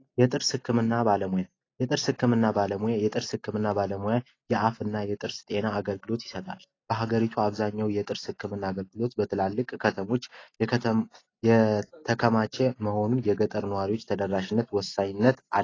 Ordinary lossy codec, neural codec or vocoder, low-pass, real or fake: AAC, 32 kbps; none; 7.2 kHz; real